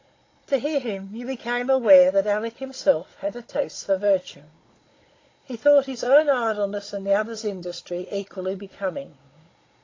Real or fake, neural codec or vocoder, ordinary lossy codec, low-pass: fake; codec, 16 kHz, 16 kbps, FunCodec, trained on Chinese and English, 50 frames a second; AAC, 32 kbps; 7.2 kHz